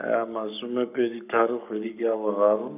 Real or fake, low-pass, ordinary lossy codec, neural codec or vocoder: fake; 3.6 kHz; none; codec, 44.1 kHz, 7.8 kbps, Pupu-Codec